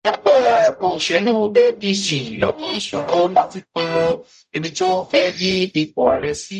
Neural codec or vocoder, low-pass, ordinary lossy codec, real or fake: codec, 44.1 kHz, 0.9 kbps, DAC; 14.4 kHz; MP3, 96 kbps; fake